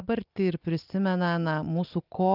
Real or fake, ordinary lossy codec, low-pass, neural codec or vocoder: real; Opus, 24 kbps; 5.4 kHz; none